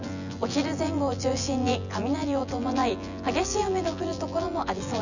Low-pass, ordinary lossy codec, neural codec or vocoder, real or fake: 7.2 kHz; none; vocoder, 24 kHz, 100 mel bands, Vocos; fake